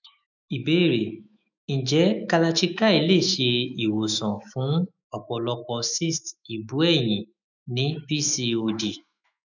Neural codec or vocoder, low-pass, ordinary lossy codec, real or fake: autoencoder, 48 kHz, 128 numbers a frame, DAC-VAE, trained on Japanese speech; 7.2 kHz; none; fake